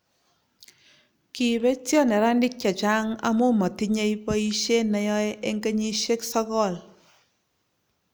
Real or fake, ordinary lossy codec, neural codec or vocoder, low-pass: real; none; none; none